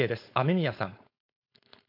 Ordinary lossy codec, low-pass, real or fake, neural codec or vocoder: MP3, 48 kbps; 5.4 kHz; fake; codec, 16 kHz, 4.8 kbps, FACodec